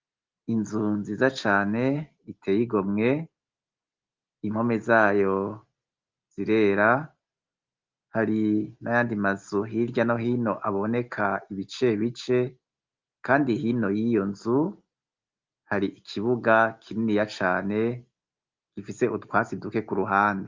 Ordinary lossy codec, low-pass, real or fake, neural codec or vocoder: Opus, 24 kbps; 7.2 kHz; real; none